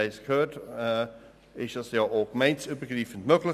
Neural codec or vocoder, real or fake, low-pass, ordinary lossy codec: none; real; 14.4 kHz; none